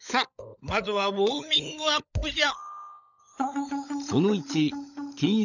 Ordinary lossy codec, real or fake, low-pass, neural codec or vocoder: none; fake; 7.2 kHz; codec, 16 kHz, 16 kbps, FunCodec, trained on LibriTTS, 50 frames a second